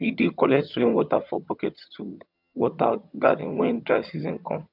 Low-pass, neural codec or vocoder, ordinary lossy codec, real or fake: 5.4 kHz; vocoder, 22.05 kHz, 80 mel bands, HiFi-GAN; none; fake